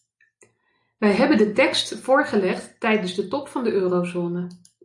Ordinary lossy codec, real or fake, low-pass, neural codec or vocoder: AAC, 64 kbps; real; 10.8 kHz; none